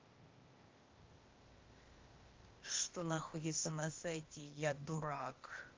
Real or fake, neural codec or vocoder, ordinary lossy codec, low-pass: fake; codec, 16 kHz, 0.8 kbps, ZipCodec; Opus, 24 kbps; 7.2 kHz